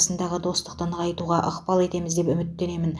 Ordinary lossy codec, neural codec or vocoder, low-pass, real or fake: none; none; none; real